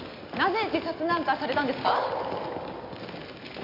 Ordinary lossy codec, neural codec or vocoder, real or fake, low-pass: AAC, 32 kbps; none; real; 5.4 kHz